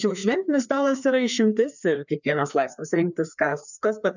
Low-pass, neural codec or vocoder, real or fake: 7.2 kHz; codec, 16 kHz, 2 kbps, FreqCodec, larger model; fake